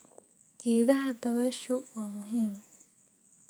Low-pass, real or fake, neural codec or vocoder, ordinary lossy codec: none; fake; codec, 44.1 kHz, 2.6 kbps, SNAC; none